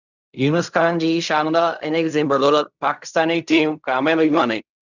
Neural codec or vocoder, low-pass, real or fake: codec, 16 kHz in and 24 kHz out, 0.4 kbps, LongCat-Audio-Codec, fine tuned four codebook decoder; 7.2 kHz; fake